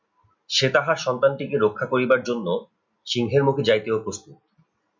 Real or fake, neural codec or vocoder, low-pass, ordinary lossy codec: real; none; 7.2 kHz; MP3, 64 kbps